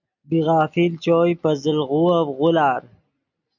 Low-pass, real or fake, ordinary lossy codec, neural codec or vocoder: 7.2 kHz; real; MP3, 64 kbps; none